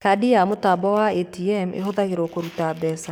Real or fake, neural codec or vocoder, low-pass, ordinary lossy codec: fake; codec, 44.1 kHz, 7.8 kbps, DAC; none; none